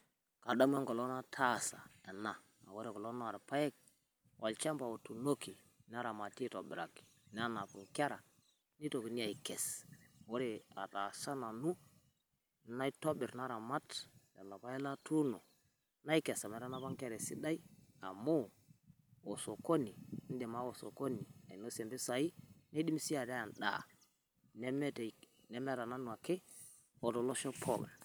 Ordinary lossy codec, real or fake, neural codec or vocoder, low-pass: none; real; none; none